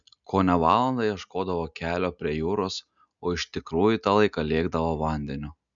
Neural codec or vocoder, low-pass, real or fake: none; 7.2 kHz; real